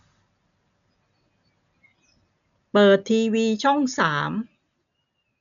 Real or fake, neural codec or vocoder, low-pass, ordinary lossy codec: real; none; 7.2 kHz; none